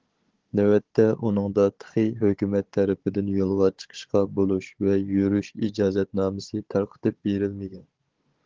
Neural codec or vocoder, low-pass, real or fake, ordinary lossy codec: codec, 16 kHz, 16 kbps, FunCodec, trained on Chinese and English, 50 frames a second; 7.2 kHz; fake; Opus, 16 kbps